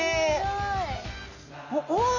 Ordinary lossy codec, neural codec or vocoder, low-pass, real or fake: none; none; 7.2 kHz; real